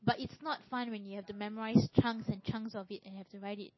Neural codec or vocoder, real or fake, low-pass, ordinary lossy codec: none; real; 7.2 kHz; MP3, 24 kbps